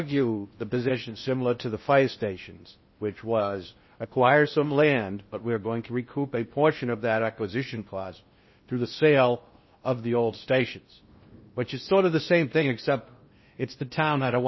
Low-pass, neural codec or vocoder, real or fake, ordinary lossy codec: 7.2 kHz; codec, 16 kHz in and 24 kHz out, 0.6 kbps, FocalCodec, streaming, 2048 codes; fake; MP3, 24 kbps